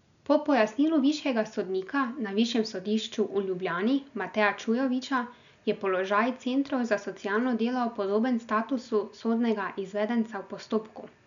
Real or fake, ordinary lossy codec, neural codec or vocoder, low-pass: real; none; none; 7.2 kHz